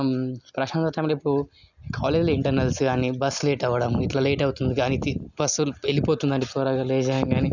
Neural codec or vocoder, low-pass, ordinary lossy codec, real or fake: none; 7.2 kHz; none; real